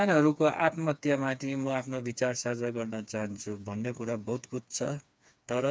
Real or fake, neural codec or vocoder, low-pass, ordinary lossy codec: fake; codec, 16 kHz, 4 kbps, FreqCodec, smaller model; none; none